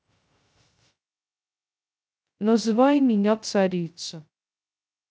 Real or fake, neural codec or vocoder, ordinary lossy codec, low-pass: fake; codec, 16 kHz, 0.2 kbps, FocalCodec; none; none